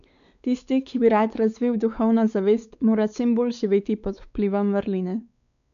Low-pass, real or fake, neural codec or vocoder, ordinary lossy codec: 7.2 kHz; fake; codec, 16 kHz, 4 kbps, X-Codec, WavLM features, trained on Multilingual LibriSpeech; none